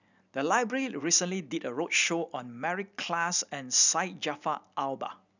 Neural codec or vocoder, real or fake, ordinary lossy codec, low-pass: none; real; none; 7.2 kHz